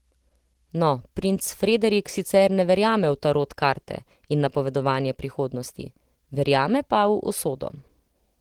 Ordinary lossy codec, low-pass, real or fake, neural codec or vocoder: Opus, 16 kbps; 19.8 kHz; real; none